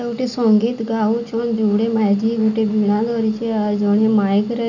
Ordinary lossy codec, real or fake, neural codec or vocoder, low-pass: none; real; none; 7.2 kHz